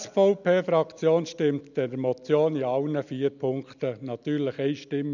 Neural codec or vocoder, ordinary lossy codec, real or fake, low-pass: none; none; real; 7.2 kHz